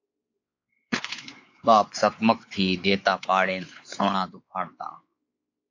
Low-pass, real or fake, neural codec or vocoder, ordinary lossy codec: 7.2 kHz; fake; codec, 16 kHz, 4 kbps, X-Codec, WavLM features, trained on Multilingual LibriSpeech; AAC, 48 kbps